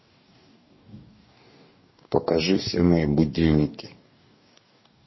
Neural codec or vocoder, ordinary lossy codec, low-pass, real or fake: codec, 44.1 kHz, 2.6 kbps, DAC; MP3, 24 kbps; 7.2 kHz; fake